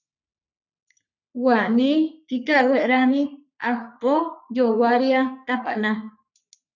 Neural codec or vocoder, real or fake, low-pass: codec, 44.1 kHz, 3.4 kbps, Pupu-Codec; fake; 7.2 kHz